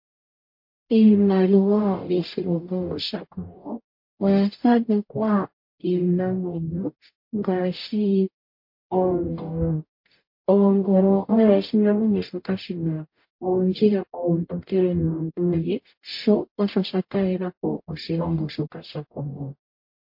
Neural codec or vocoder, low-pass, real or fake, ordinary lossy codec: codec, 44.1 kHz, 0.9 kbps, DAC; 5.4 kHz; fake; MP3, 32 kbps